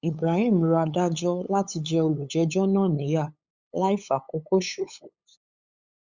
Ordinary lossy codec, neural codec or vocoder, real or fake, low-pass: Opus, 64 kbps; codec, 16 kHz, 8 kbps, FunCodec, trained on LibriTTS, 25 frames a second; fake; 7.2 kHz